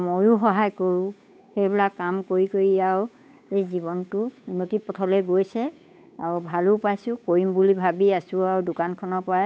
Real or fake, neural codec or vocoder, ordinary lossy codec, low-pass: fake; codec, 16 kHz, 8 kbps, FunCodec, trained on Chinese and English, 25 frames a second; none; none